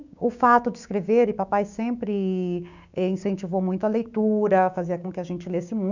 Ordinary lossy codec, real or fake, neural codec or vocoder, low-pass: none; fake; codec, 16 kHz, 6 kbps, DAC; 7.2 kHz